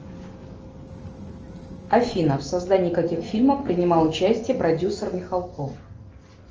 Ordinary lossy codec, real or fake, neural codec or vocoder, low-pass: Opus, 24 kbps; real; none; 7.2 kHz